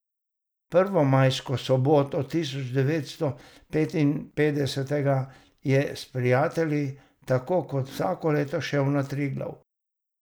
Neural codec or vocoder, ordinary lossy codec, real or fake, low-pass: none; none; real; none